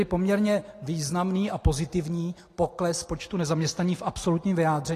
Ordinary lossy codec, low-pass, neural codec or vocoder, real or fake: AAC, 48 kbps; 14.4 kHz; none; real